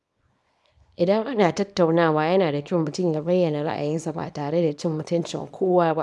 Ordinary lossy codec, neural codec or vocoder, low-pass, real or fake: none; codec, 24 kHz, 0.9 kbps, WavTokenizer, small release; none; fake